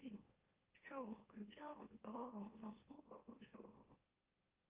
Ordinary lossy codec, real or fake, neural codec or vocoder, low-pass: Opus, 32 kbps; fake; autoencoder, 44.1 kHz, a latent of 192 numbers a frame, MeloTTS; 3.6 kHz